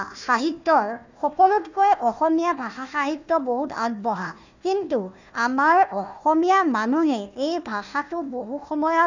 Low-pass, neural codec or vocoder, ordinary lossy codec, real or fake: 7.2 kHz; codec, 16 kHz, 1 kbps, FunCodec, trained on Chinese and English, 50 frames a second; none; fake